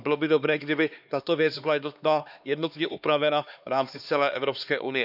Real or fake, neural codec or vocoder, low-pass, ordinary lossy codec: fake; codec, 16 kHz, 2 kbps, X-Codec, HuBERT features, trained on LibriSpeech; 5.4 kHz; none